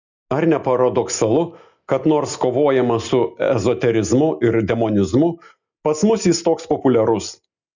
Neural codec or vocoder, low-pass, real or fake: none; 7.2 kHz; real